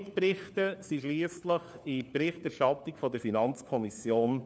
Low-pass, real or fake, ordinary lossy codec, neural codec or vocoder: none; fake; none; codec, 16 kHz, 4 kbps, FunCodec, trained on LibriTTS, 50 frames a second